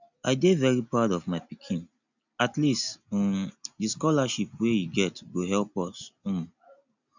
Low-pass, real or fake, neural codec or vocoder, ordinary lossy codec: 7.2 kHz; real; none; none